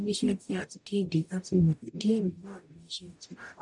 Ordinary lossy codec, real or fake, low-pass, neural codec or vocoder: none; fake; 10.8 kHz; codec, 44.1 kHz, 0.9 kbps, DAC